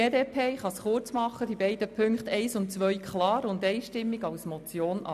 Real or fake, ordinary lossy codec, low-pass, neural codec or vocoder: real; none; 14.4 kHz; none